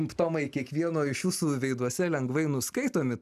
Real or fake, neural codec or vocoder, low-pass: real; none; 14.4 kHz